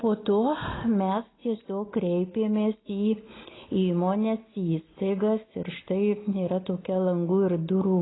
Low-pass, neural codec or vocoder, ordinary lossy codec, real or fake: 7.2 kHz; none; AAC, 16 kbps; real